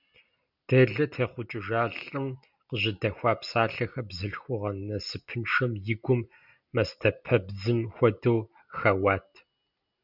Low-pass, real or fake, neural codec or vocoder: 5.4 kHz; real; none